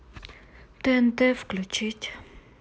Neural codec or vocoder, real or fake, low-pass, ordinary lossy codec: none; real; none; none